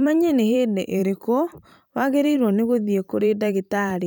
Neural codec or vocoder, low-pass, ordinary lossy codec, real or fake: vocoder, 44.1 kHz, 128 mel bands every 512 samples, BigVGAN v2; none; none; fake